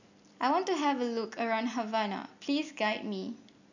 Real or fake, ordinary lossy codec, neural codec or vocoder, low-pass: real; none; none; 7.2 kHz